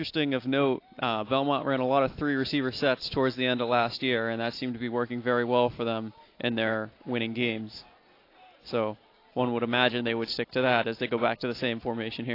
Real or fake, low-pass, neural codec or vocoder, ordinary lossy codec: real; 5.4 kHz; none; AAC, 32 kbps